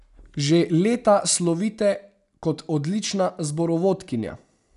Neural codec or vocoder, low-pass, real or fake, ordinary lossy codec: none; 10.8 kHz; real; none